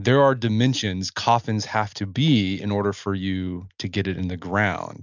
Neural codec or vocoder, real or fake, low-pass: none; real; 7.2 kHz